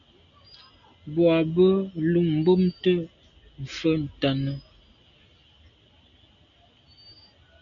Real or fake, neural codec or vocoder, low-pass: real; none; 7.2 kHz